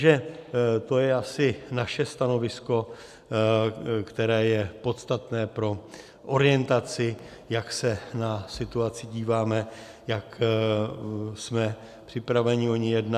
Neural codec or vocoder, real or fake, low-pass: none; real; 14.4 kHz